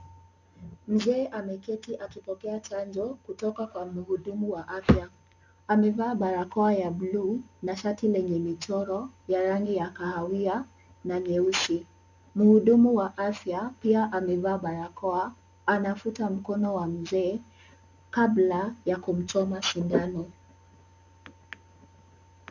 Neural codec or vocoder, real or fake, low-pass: none; real; 7.2 kHz